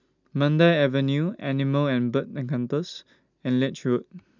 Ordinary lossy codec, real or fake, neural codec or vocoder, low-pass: none; real; none; 7.2 kHz